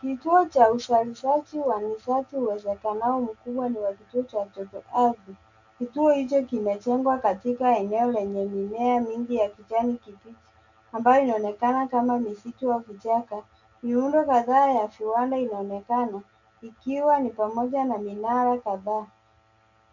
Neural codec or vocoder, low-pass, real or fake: none; 7.2 kHz; real